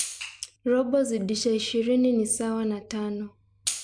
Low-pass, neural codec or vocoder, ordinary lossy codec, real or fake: 9.9 kHz; none; MP3, 64 kbps; real